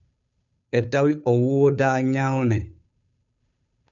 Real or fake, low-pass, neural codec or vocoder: fake; 7.2 kHz; codec, 16 kHz, 2 kbps, FunCodec, trained on Chinese and English, 25 frames a second